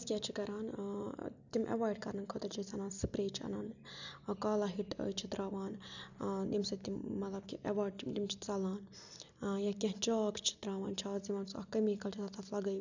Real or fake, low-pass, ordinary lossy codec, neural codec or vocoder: real; 7.2 kHz; none; none